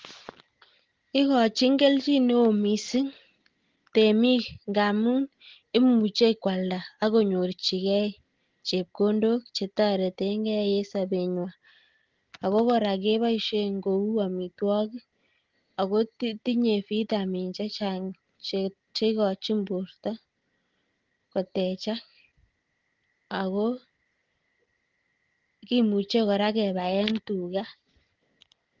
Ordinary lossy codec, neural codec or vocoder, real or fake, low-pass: Opus, 16 kbps; none; real; 7.2 kHz